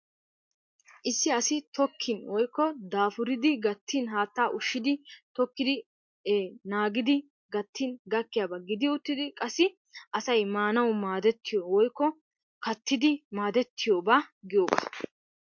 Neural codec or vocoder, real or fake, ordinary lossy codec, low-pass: none; real; MP3, 48 kbps; 7.2 kHz